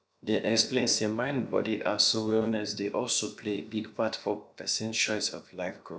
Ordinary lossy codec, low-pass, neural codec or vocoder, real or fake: none; none; codec, 16 kHz, about 1 kbps, DyCAST, with the encoder's durations; fake